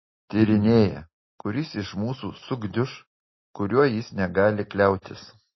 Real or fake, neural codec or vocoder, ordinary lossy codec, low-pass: real; none; MP3, 24 kbps; 7.2 kHz